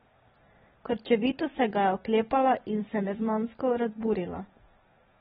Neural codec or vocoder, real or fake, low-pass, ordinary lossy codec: none; real; 7.2 kHz; AAC, 16 kbps